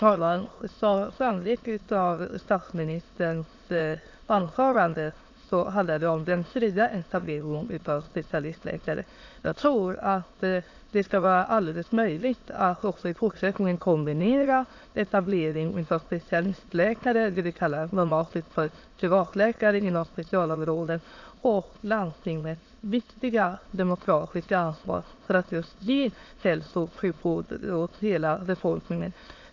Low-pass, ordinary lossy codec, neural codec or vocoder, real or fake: 7.2 kHz; AAC, 48 kbps; autoencoder, 22.05 kHz, a latent of 192 numbers a frame, VITS, trained on many speakers; fake